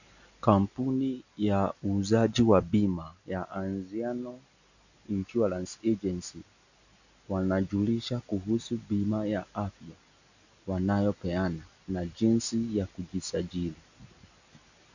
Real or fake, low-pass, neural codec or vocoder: real; 7.2 kHz; none